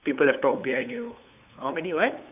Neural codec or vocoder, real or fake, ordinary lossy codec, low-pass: codec, 16 kHz, 8 kbps, FunCodec, trained on LibriTTS, 25 frames a second; fake; none; 3.6 kHz